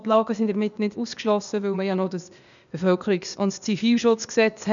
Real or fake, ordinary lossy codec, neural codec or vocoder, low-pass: fake; none; codec, 16 kHz, 0.8 kbps, ZipCodec; 7.2 kHz